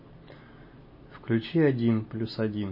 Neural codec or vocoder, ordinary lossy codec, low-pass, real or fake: none; MP3, 24 kbps; 5.4 kHz; real